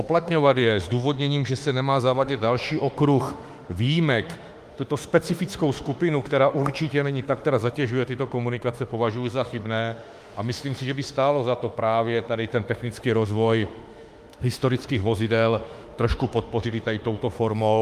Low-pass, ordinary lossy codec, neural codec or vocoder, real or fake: 14.4 kHz; Opus, 32 kbps; autoencoder, 48 kHz, 32 numbers a frame, DAC-VAE, trained on Japanese speech; fake